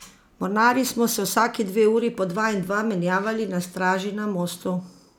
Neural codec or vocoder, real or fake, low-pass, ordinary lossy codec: none; real; 19.8 kHz; none